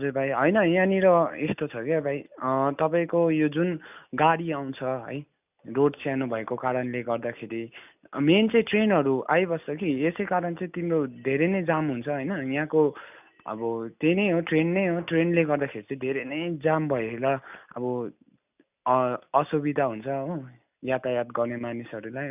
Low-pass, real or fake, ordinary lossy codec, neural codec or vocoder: 3.6 kHz; real; none; none